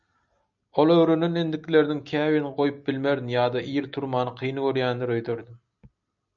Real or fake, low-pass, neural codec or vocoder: real; 7.2 kHz; none